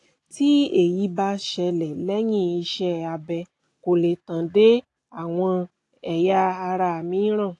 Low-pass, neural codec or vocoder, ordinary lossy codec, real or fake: 10.8 kHz; none; AAC, 48 kbps; real